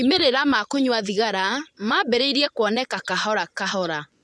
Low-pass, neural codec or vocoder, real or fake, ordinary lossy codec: none; vocoder, 24 kHz, 100 mel bands, Vocos; fake; none